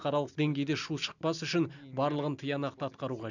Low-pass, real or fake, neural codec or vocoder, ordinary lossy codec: 7.2 kHz; real; none; none